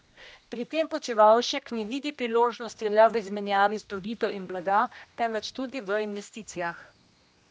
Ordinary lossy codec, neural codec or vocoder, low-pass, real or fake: none; codec, 16 kHz, 1 kbps, X-Codec, HuBERT features, trained on general audio; none; fake